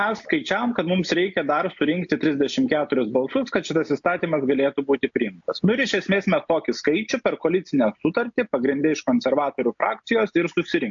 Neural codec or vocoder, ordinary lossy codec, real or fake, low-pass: none; AAC, 64 kbps; real; 7.2 kHz